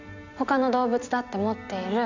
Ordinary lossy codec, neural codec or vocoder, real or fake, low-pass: none; none; real; 7.2 kHz